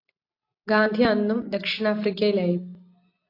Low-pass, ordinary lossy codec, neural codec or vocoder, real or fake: 5.4 kHz; AAC, 32 kbps; none; real